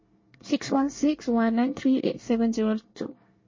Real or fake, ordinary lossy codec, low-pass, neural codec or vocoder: fake; MP3, 32 kbps; 7.2 kHz; codec, 24 kHz, 1 kbps, SNAC